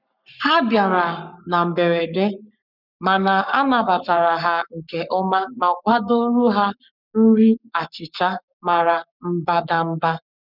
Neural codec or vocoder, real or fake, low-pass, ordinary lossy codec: codec, 44.1 kHz, 7.8 kbps, Pupu-Codec; fake; 5.4 kHz; none